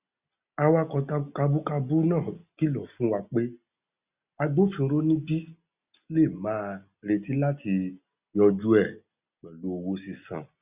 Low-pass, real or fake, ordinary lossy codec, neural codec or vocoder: 3.6 kHz; real; Opus, 64 kbps; none